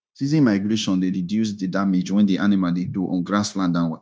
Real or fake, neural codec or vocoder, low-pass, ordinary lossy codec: fake; codec, 16 kHz, 0.9 kbps, LongCat-Audio-Codec; none; none